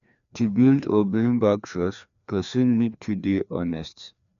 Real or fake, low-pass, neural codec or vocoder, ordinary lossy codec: fake; 7.2 kHz; codec, 16 kHz, 2 kbps, FreqCodec, larger model; none